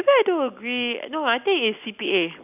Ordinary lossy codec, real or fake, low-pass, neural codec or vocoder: none; real; 3.6 kHz; none